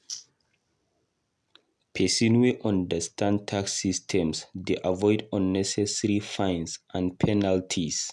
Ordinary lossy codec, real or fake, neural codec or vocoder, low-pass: none; real; none; none